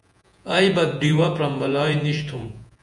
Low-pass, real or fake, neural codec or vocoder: 10.8 kHz; fake; vocoder, 48 kHz, 128 mel bands, Vocos